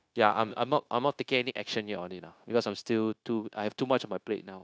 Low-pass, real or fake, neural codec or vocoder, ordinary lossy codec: none; fake; codec, 16 kHz, 0.9 kbps, LongCat-Audio-Codec; none